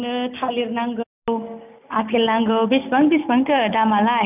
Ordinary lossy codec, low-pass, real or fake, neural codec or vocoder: none; 3.6 kHz; real; none